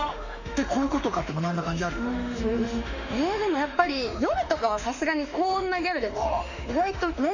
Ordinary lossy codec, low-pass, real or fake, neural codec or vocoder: none; 7.2 kHz; fake; autoencoder, 48 kHz, 32 numbers a frame, DAC-VAE, trained on Japanese speech